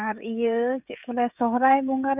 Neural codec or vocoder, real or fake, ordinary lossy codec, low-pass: codec, 16 kHz, 16 kbps, FreqCodec, smaller model; fake; none; 3.6 kHz